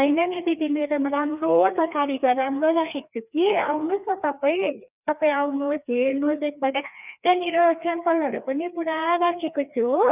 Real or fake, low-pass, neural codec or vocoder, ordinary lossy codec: fake; 3.6 kHz; codec, 16 kHz, 2 kbps, FreqCodec, larger model; none